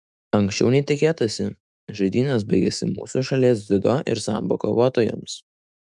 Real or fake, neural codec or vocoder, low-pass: fake; autoencoder, 48 kHz, 128 numbers a frame, DAC-VAE, trained on Japanese speech; 10.8 kHz